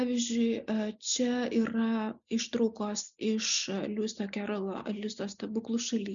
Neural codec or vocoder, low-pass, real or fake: none; 7.2 kHz; real